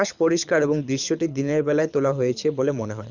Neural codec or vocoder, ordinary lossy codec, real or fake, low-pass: codec, 24 kHz, 6 kbps, HILCodec; none; fake; 7.2 kHz